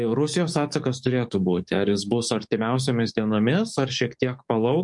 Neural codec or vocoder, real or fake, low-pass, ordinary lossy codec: codec, 44.1 kHz, 7.8 kbps, DAC; fake; 10.8 kHz; MP3, 64 kbps